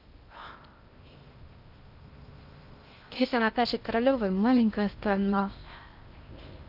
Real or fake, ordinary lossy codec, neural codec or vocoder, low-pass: fake; Opus, 64 kbps; codec, 16 kHz in and 24 kHz out, 0.6 kbps, FocalCodec, streaming, 2048 codes; 5.4 kHz